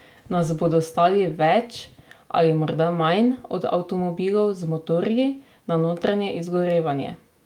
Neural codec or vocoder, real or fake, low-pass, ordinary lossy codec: autoencoder, 48 kHz, 128 numbers a frame, DAC-VAE, trained on Japanese speech; fake; 19.8 kHz; Opus, 32 kbps